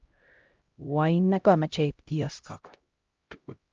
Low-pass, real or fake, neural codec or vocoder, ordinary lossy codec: 7.2 kHz; fake; codec, 16 kHz, 0.5 kbps, X-Codec, HuBERT features, trained on LibriSpeech; Opus, 24 kbps